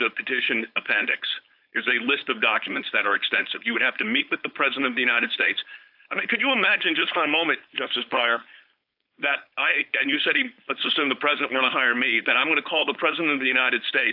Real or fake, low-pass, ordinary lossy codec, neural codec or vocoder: fake; 5.4 kHz; AAC, 48 kbps; codec, 16 kHz, 4.8 kbps, FACodec